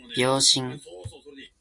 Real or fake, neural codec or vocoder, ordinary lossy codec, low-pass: real; none; AAC, 64 kbps; 10.8 kHz